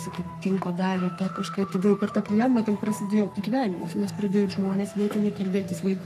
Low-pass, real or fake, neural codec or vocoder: 14.4 kHz; fake; codec, 44.1 kHz, 3.4 kbps, Pupu-Codec